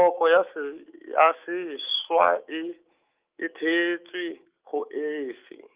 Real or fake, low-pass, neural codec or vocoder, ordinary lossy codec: fake; 3.6 kHz; autoencoder, 48 kHz, 128 numbers a frame, DAC-VAE, trained on Japanese speech; Opus, 32 kbps